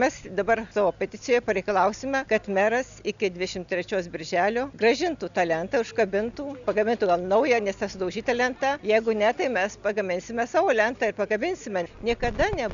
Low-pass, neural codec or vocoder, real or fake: 7.2 kHz; none; real